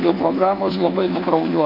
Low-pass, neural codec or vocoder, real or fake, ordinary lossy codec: 5.4 kHz; codec, 24 kHz, 1.2 kbps, DualCodec; fake; AAC, 48 kbps